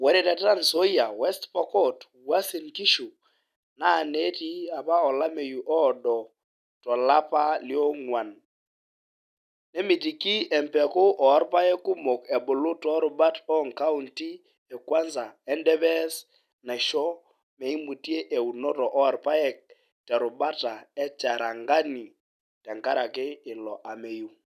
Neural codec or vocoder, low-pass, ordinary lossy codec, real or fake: none; 14.4 kHz; none; real